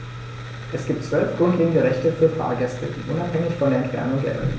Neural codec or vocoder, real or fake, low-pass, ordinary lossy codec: none; real; none; none